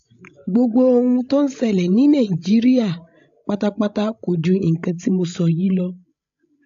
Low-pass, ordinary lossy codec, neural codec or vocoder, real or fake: 7.2 kHz; AAC, 64 kbps; codec, 16 kHz, 16 kbps, FreqCodec, larger model; fake